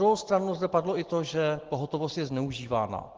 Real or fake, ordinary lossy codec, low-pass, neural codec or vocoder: real; Opus, 16 kbps; 7.2 kHz; none